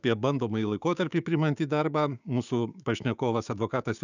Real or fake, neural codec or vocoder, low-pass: fake; codec, 16 kHz, 6 kbps, DAC; 7.2 kHz